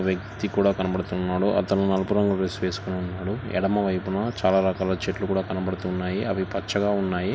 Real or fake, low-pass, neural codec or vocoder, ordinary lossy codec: real; none; none; none